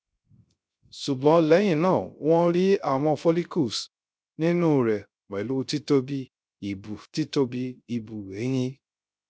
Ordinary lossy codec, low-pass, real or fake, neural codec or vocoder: none; none; fake; codec, 16 kHz, 0.3 kbps, FocalCodec